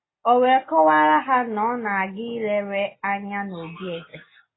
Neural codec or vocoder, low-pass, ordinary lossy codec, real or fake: none; 7.2 kHz; AAC, 16 kbps; real